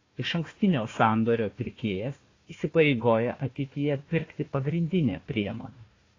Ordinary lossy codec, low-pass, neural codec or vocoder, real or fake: AAC, 32 kbps; 7.2 kHz; codec, 16 kHz, 1 kbps, FunCodec, trained on Chinese and English, 50 frames a second; fake